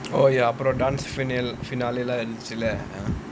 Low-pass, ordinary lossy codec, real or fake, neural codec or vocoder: none; none; real; none